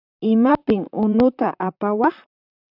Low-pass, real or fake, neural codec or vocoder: 5.4 kHz; fake; vocoder, 44.1 kHz, 128 mel bands, Pupu-Vocoder